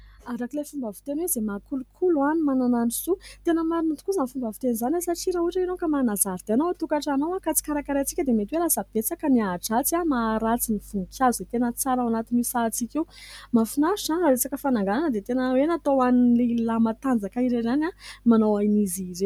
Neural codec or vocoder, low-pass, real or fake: none; 19.8 kHz; real